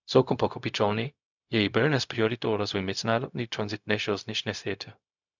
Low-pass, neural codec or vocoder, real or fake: 7.2 kHz; codec, 16 kHz, 0.4 kbps, LongCat-Audio-Codec; fake